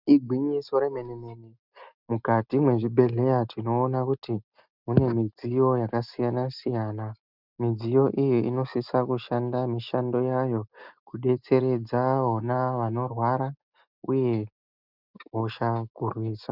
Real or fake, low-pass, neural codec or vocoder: real; 5.4 kHz; none